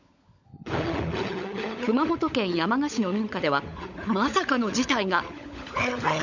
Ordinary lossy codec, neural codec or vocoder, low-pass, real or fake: none; codec, 16 kHz, 16 kbps, FunCodec, trained on LibriTTS, 50 frames a second; 7.2 kHz; fake